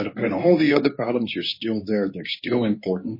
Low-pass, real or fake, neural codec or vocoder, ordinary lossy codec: 5.4 kHz; fake; codec, 24 kHz, 0.9 kbps, WavTokenizer, medium speech release version 2; MP3, 24 kbps